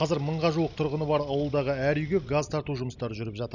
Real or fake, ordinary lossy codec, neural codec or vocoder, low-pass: real; none; none; 7.2 kHz